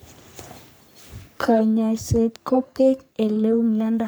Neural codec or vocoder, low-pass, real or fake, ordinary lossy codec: codec, 44.1 kHz, 3.4 kbps, Pupu-Codec; none; fake; none